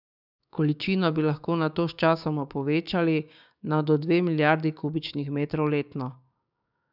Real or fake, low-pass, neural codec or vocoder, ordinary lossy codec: fake; 5.4 kHz; codec, 16 kHz, 6 kbps, DAC; none